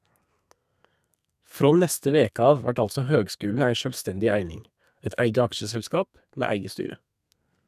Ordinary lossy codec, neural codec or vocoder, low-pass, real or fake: none; codec, 32 kHz, 1.9 kbps, SNAC; 14.4 kHz; fake